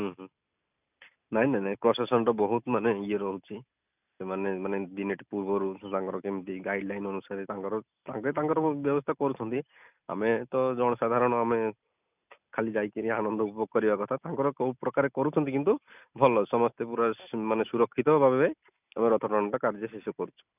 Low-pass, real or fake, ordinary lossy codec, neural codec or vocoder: 3.6 kHz; real; none; none